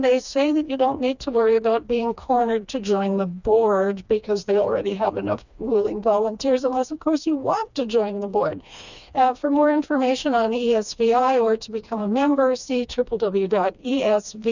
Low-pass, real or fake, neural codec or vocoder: 7.2 kHz; fake; codec, 16 kHz, 2 kbps, FreqCodec, smaller model